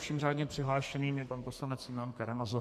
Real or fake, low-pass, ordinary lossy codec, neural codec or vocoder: fake; 14.4 kHz; MP3, 96 kbps; codec, 44.1 kHz, 2.6 kbps, SNAC